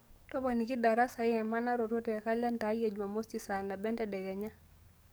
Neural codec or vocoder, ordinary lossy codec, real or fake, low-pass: codec, 44.1 kHz, 7.8 kbps, DAC; none; fake; none